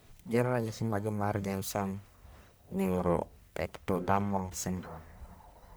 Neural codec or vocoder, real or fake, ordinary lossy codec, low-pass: codec, 44.1 kHz, 1.7 kbps, Pupu-Codec; fake; none; none